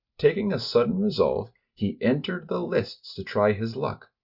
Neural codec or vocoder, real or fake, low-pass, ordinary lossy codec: none; real; 5.4 kHz; Opus, 64 kbps